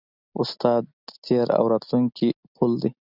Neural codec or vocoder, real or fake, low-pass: none; real; 5.4 kHz